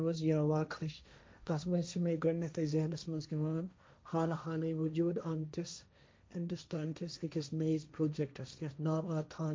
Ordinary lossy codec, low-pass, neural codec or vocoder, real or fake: none; none; codec, 16 kHz, 1.1 kbps, Voila-Tokenizer; fake